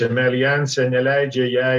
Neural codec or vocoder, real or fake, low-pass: none; real; 14.4 kHz